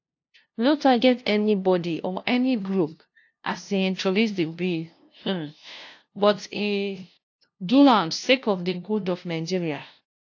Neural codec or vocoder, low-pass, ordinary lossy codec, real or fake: codec, 16 kHz, 0.5 kbps, FunCodec, trained on LibriTTS, 25 frames a second; 7.2 kHz; AAC, 48 kbps; fake